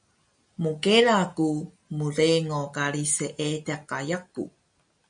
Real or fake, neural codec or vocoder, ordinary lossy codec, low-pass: real; none; MP3, 96 kbps; 9.9 kHz